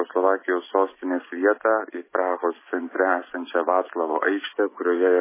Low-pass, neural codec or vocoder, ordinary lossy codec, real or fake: 3.6 kHz; none; MP3, 16 kbps; real